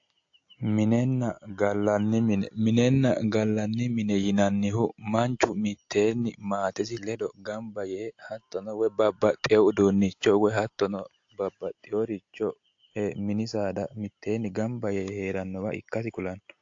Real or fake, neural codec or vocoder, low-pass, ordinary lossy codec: real; none; 7.2 kHz; AAC, 48 kbps